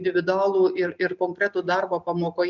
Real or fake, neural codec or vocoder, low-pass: real; none; 7.2 kHz